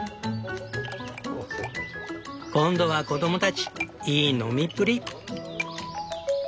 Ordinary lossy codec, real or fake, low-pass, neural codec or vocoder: none; real; none; none